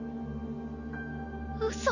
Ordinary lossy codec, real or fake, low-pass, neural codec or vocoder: none; real; 7.2 kHz; none